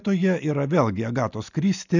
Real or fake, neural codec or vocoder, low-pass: real; none; 7.2 kHz